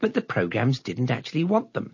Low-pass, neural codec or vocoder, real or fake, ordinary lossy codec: 7.2 kHz; none; real; MP3, 32 kbps